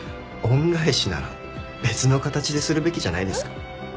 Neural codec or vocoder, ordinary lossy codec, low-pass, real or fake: none; none; none; real